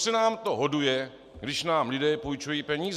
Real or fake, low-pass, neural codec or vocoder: real; 14.4 kHz; none